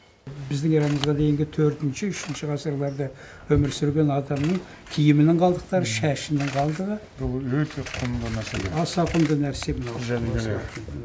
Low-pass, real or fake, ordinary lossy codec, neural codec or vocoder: none; real; none; none